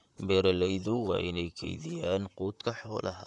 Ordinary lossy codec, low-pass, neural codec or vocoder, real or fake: none; 10.8 kHz; vocoder, 44.1 kHz, 128 mel bands, Pupu-Vocoder; fake